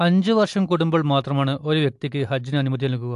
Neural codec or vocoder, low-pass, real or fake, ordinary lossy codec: none; 10.8 kHz; real; AAC, 64 kbps